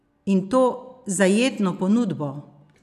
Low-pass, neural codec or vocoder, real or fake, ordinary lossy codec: 14.4 kHz; none; real; none